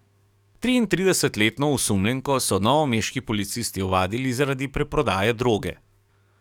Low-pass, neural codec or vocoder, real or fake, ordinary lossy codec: 19.8 kHz; codec, 44.1 kHz, 7.8 kbps, DAC; fake; none